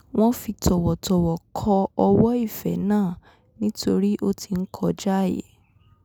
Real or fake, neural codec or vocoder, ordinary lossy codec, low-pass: real; none; none; none